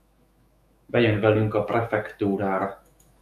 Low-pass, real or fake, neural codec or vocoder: 14.4 kHz; fake; autoencoder, 48 kHz, 128 numbers a frame, DAC-VAE, trained on Japanese speech